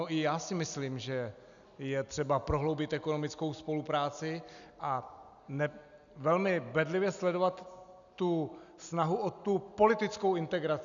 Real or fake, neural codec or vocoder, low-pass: real; none; 7.2 kHz